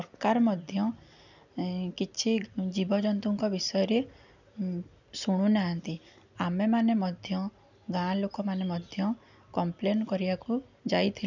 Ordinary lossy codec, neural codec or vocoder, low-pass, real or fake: none; none; 7.2 kHz; real